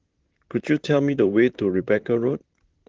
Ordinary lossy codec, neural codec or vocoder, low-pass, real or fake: Opus, 16 kbps; vocoder, 44.1 kHz, 128 mel bands, Pupu-Vocoder; 7.2 kHz; fake